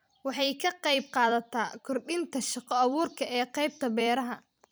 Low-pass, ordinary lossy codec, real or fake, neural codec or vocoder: none; none; fake; vocoder, 44.1 kHz, 128 mel bands every 256 samples, BigVGAN v2